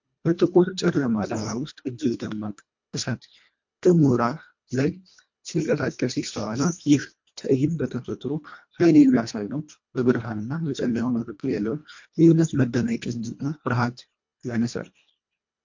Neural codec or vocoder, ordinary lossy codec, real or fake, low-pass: codec, 24 kHz, 1.5 kbps, HILCodec; MP3, 48 kbps; fake; 7.2 kHz